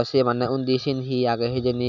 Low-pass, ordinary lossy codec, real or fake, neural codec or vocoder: 7.2 kHz; none; real; none